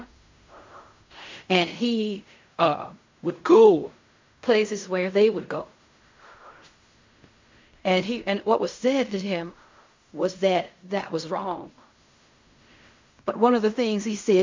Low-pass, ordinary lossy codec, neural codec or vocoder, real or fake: 7.2 kHz; MP3, 64 kbps; codec, 16 kHz in and 24 kHz out, 0.4 kbps, LongCat-Audio-Codec, fine tuned four codebook decoder; fake